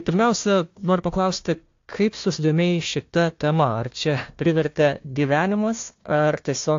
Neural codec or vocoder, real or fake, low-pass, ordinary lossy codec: codec, 16 kHz, 1 kbps, FunCodec, trained on Chinese and English, 50 frames a second; fake; 7.2 kHz; AAC, 48 kbps